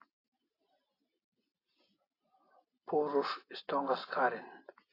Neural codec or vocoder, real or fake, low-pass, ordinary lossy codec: none; real; 5.4 kHz; AAC, 24 kbps